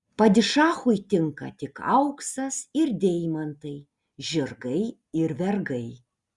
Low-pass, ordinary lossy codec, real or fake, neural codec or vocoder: 10.8 kHz; Opus, 64 kbps; real; none